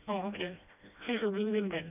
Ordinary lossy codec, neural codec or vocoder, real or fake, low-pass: none; codec, 16 kHz, 1 kbps, FreqCodec, smaller model; fake; 3.6 kHz